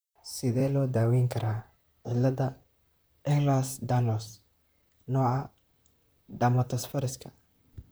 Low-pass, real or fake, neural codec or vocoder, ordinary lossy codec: none; fake; vocoder, 44.1 kHz, 128 mel bands, Pupu-Vocoder; none